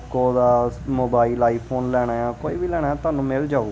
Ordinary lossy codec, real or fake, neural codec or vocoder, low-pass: none; real; none; none